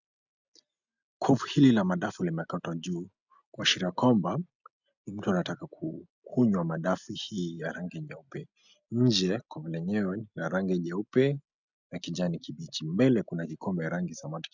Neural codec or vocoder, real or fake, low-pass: none; real; 7.2 kHz